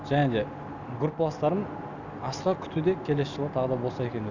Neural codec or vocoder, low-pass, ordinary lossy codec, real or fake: none; 7.2 kHz; none; real